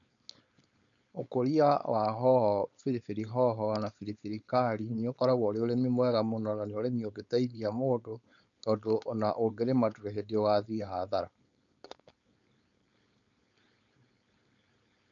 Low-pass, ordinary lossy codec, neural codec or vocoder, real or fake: 7.2 kHz; none; codec, 16 kHz, 4.8 kbps, FACodec; fake